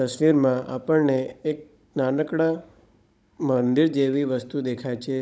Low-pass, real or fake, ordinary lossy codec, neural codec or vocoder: none; fake; none; codec, 16 kHz, 16 kbps, FunCodec, trained on Chinese and English, 50 frames a second